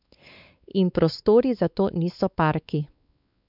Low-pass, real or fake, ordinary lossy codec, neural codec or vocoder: 5.4 kHz; fake; none; codec, 16 kHz, 4 kbps, X-Codec, WavLM features, trained on Multilingual LibriSpeech